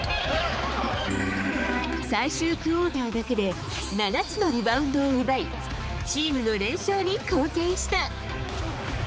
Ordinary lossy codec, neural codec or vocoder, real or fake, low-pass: none; codec, 16 kHz, 4 kbps, X-Codec, HuBERT features, trained on balanced general audio; fake; none